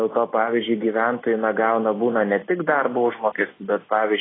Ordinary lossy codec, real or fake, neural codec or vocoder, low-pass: AAC, 16 kbps; real; none; 7.2 kHz